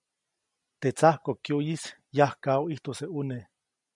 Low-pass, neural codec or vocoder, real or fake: 10.8 kHz; none; real